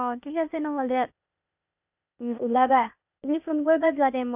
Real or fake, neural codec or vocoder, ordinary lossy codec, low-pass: fake; codec, 16 kHz, 0.8 kbps, ZipCodec; none; 3.6 kHz